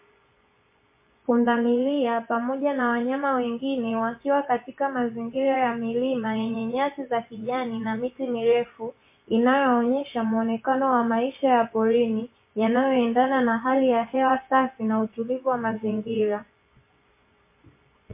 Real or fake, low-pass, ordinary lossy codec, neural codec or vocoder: fake; 3.6 kHz; MP3, 24 kbps; vocoder, 24 kHz, 100 mel bands, Vocos